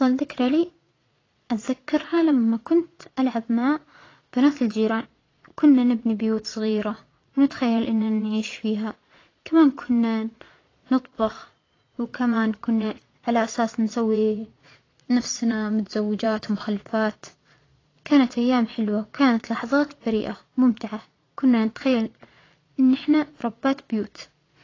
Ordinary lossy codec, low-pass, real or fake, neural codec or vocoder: AAC, 32 kbps; 7.2 kHz; fake; vocoder, 22.05 kHz, 80 mel bands, Vocos